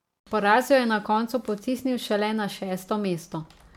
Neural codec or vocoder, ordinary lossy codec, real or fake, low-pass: none; MP3, 96 kbps; real; 19.8 kHz